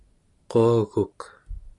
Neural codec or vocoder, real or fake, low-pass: none; real; 10.8 kHz